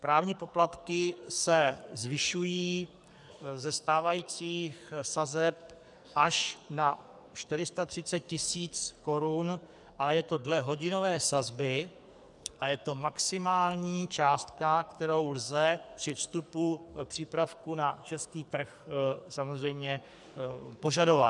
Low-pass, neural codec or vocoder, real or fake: 10.8 kHz; codec, 44.1 kHz, 2.6 kbps, SNAC; fake